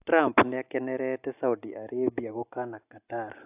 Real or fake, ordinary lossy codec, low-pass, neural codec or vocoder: real; AAC, 32 kbps; 3.6 kHz; none